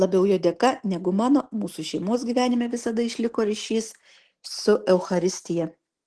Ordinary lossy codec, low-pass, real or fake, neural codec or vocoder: Opus, 16 kbps; 10.8 kHz; real; none